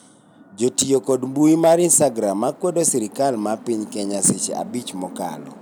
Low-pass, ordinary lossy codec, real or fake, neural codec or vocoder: none; none; real; none